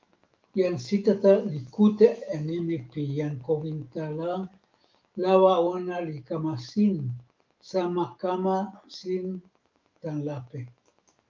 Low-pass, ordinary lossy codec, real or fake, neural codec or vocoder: 7.2 kHz; Opus, 24 kbps; fake; autoencoder, 48 kHz, 128 numbers a frame, DAC-VAE, trained on Japanese speech